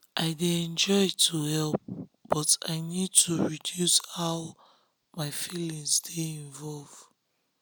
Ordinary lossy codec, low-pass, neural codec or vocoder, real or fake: none; none; none; real